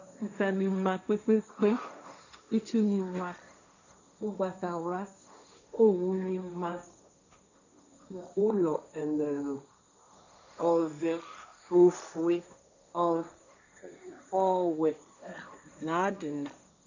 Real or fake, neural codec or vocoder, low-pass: fake; codec, 16 kHz, 1.1 kbps, Voila-Tokenizer; 7.2 kHz